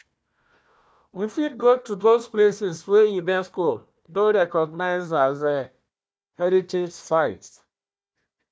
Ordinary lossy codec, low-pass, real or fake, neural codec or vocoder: none; none; fake; codec, 16 kHz, 1 kbps, FunCodec, trained on Chinese and English, 50 frames a second